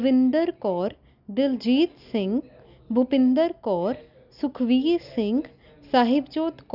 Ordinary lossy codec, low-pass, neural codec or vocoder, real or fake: none; 5.4 kHz; none; real